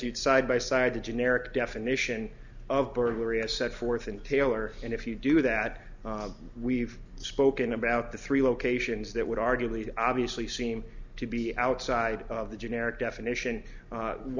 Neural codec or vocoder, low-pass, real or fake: none; 7.2 kHz; real